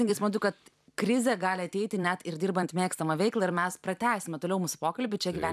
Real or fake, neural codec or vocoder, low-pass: fake; vocoder, 44.1 kHz, 128 mel bands every 256 samples, BigVGAN v2; 14.4 kHz